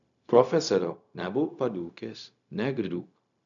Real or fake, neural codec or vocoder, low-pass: fake; codec, 16 kHz, 0.4 kbps, LongCat-Audio-Codec; 7.2 kHz